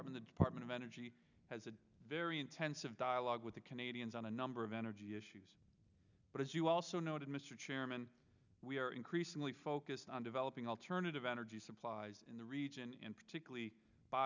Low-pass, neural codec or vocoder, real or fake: 7.2 kHz; none; real